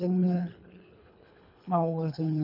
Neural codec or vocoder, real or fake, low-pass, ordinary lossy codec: codec, 24 kHz, 3 kbps, HILCodec; fake; 5.4 kHz; none